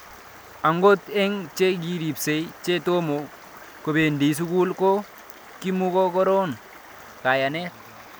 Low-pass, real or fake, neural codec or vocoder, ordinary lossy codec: none; real; none; none